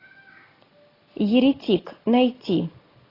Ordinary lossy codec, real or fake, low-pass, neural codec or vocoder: AAC, 24 kbps; real; 5.4 kHz; none